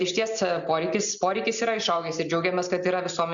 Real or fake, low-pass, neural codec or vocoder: real; 7.2 kHz; none